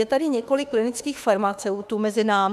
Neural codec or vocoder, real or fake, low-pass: autoencoder, 48 kHz, 32 numbers a frame, DAC-VAE, trained on Japanese speech; fake; 14.4 kHz